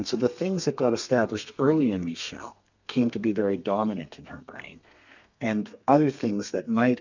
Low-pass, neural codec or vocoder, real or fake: 7.2 kHz; codec, 32 kHz, 1.9 kbps, SNAC; fake